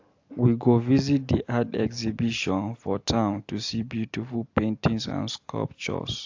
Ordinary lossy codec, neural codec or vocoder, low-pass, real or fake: AAC, 48 kbps; none; 7.2 kHz; real